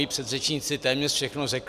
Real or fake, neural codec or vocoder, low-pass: real; none; 14.4 kHz